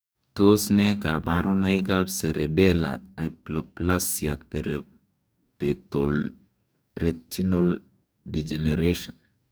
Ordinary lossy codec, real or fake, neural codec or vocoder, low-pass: none; fake; codec, 44.1 kHz, 2.6 kbps, DAC; none